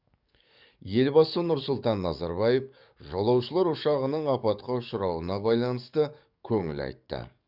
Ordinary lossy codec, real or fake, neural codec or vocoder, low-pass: none; fake; codec, 44.1 kHz, 7.8 kbps, DAC; 5.4 kHz